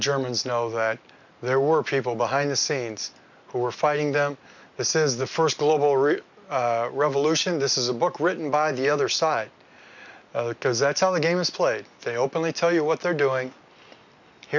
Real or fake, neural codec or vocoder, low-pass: real; none; 7.2 kHz